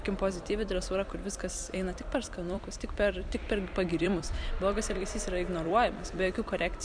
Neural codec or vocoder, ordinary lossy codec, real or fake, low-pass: vocoder, 44.1 kHz, 128 mel bands every 256 samples, BigVGAN v2; MP3, 96 kbps; fake; 9.9 kHz